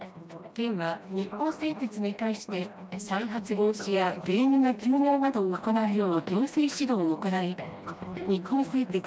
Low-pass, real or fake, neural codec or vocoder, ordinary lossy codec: none; fake; codec, 16 kHz, 1 kbps, FreqCodec, smaller model; none